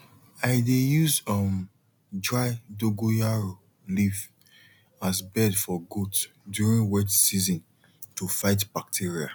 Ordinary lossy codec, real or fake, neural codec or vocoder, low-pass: none; real; none; none